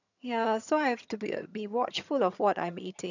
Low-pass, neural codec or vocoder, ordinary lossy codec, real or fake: 7.2 kHz; vocoder, 22.05 kHz, 80 mel bands, HiFi-GAN; none; fake